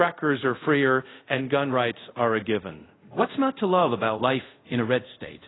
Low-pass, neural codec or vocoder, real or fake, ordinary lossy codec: 7.2 kHz; codec, 24 kHz, 0.5 kbps, DualCodec; fake; AAC, 16 kbps